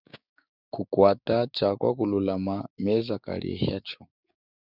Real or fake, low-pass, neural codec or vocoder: fake; 5.4 kHz; codec, 16 kHz, 6 kbps, DAC